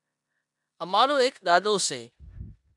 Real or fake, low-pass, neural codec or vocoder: fake; 10.8 kHz; codec, 16 kHz in and 24 kHz out, 0.9 kbps, LongCat-Audio-Codec, four codebook decoder